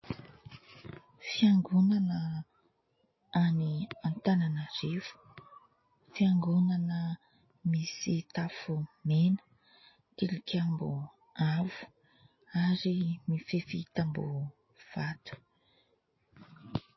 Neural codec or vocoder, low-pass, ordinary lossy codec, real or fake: none; 7.2 kHz; MP3, 24 kbps; real